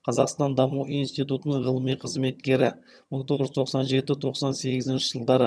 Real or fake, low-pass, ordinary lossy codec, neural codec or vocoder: fake; none; none; vocoder, 22.05 kHz, 80 mel bands, HiFi-GAN